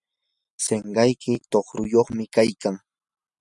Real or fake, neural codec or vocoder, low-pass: real; none; 10.8 kHz